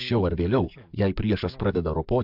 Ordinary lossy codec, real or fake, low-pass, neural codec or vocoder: AAC, 48 kbps; fake; 5.4 kHz; codec, 44.1 kHz, 7.8 kbps, DAC